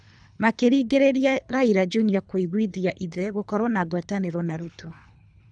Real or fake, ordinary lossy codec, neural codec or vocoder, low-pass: fake; none; codec, 24 kHz, 3 kbps, HILCodec; 9.9 kHz